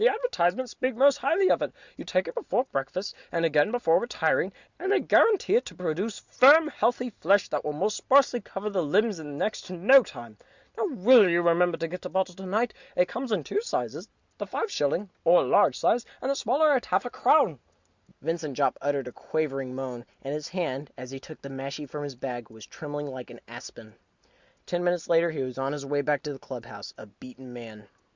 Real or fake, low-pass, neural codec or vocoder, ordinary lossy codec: real; 7.2 kHz; none; Opus, 64 kbps